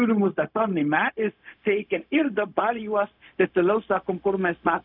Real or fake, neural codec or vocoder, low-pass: fake; codec, 16 kHz, 0.4 kbps, LongCat-Audio-Codec; 5.4 kHz